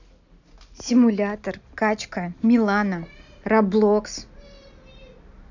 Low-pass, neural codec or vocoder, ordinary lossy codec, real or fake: 7.2 kHz; none; none; real